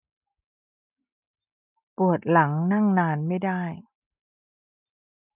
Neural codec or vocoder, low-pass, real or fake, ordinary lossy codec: none; 3.6 kHz; real; none